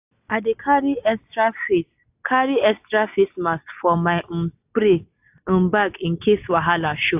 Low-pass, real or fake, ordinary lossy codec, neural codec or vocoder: 3.6 kHz; real; AAC, 32 kbps; none